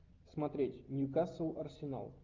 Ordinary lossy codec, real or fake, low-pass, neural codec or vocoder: Opus, 32 kbps; real; 7.2 kHz; none